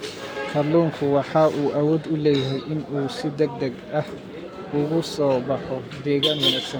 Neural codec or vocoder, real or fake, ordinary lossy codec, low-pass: codec, 44.1 kHz, 7.8 kbps, Pupu-Codec; fake; none; none